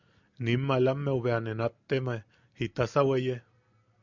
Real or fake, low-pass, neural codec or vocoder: real; 7.2 kHz; none